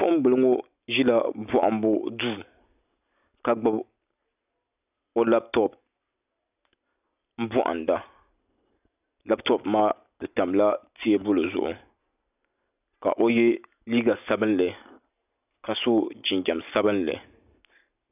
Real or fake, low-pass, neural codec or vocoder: real; 3.6 kHz; none